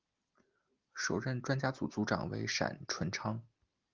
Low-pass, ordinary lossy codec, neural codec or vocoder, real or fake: 7.2 kHz; Opus, 32 kbps; none; real